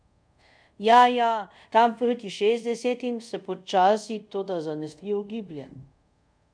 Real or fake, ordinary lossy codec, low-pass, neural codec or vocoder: fake; none; 9.9 kHz; codec, 24 kHz, 0.5 kbps, DualCodec